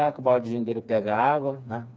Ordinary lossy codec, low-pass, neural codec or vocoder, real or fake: none; none; codec, 16 kHz, 2 kbps, FreqCodec, smaller model; fake